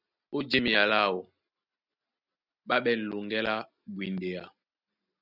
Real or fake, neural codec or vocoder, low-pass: real; none; 5.4 kHz